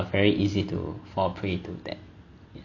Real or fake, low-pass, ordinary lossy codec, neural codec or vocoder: real; 7.2 kHz; none; none